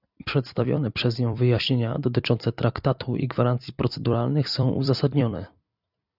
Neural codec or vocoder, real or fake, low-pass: vocoder, 44.1 kHz, 128 mel bands every 256 samples, BigVGAN v2; fake; 5.4 kHz